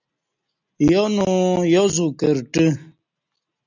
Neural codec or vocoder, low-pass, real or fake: none; 7.2 kHz; real